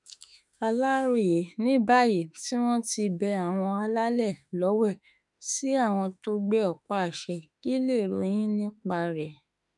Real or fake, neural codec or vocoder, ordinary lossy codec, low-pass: fake; autoencoder, 48 kHz, 32 numbers a frame, DAC-VAE, trained on Japanese speech; none; 10.8 kHz